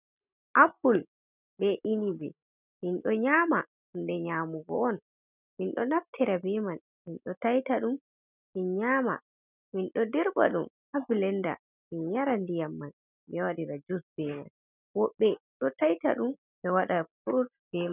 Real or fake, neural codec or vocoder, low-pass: real; none; 3.6 kHz